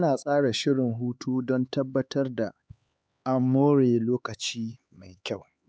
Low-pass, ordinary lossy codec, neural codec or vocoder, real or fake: none; none; codec, 16 kHz, 4 kbps, X-Codec, HuBERT features, trained on LibriSpeech; fake